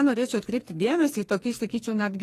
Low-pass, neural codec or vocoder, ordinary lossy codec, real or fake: 14.4 kHz; codec, 44.1 kHz, 2.6 kbps, SNAC; AAC, 48 kbps; fake